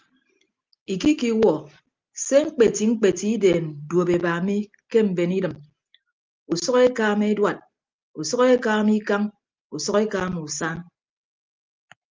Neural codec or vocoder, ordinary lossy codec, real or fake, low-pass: none; Opus, 32 kbps; real; 7.2 kHz